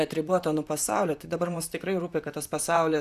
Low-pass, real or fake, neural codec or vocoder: 14.4 kHz; fake; vocoder, 44.1 kHz, 128 mel bands, Pupu-Vocoder